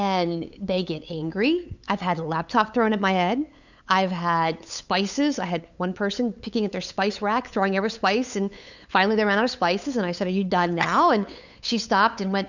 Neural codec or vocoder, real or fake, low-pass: codec, 16 kHz, 8 kbps, FunCodec, trained on LibriTTS, 25 frames a second; fake; 7.2 kHz